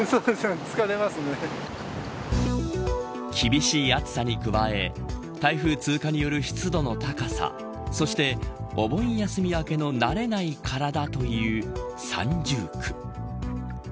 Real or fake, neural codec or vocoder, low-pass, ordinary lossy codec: real; none; none; none